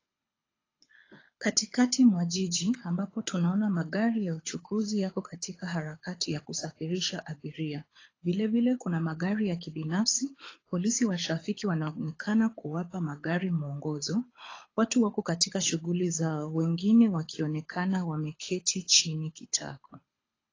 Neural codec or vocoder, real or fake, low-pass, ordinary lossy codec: codec, 24 kHz, 6 kbps, HILCodec; fake; 7.2 kHz; AAC, 32 kbps